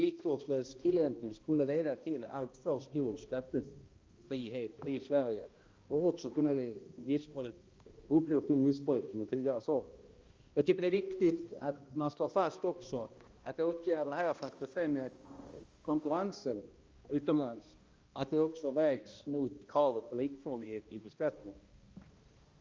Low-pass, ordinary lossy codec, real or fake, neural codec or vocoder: 7.2 kHz; Opus, 32 kbps; fake; codec, 16 kHz, 1 kbps, X-Codec, HuBERT features, trained on balanced general audio